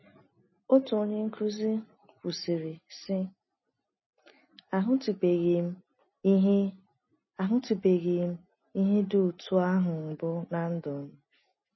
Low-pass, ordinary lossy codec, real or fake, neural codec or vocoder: 7.2 kHz; MP3, 24 kbps; real; none